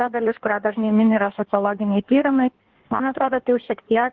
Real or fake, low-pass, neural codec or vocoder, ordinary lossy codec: fake; 7.2 kHz; codec, 16 kHz in and 24 kHz out, 1.1 kbps, FireRedTTS-2 codec; Opus, 16 kbps